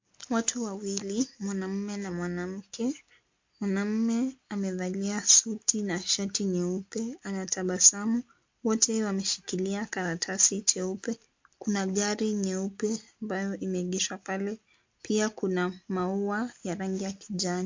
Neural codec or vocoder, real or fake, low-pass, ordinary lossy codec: none; real; 7.2 kHz; MP3, 48 kbps